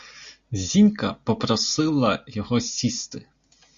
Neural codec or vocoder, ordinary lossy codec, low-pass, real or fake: none; Opus, 64 kbps; 7.2 kHz; real